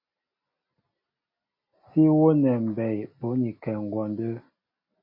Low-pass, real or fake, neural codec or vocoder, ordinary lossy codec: 5.4 kHz; real; none; MP3, 24 kbps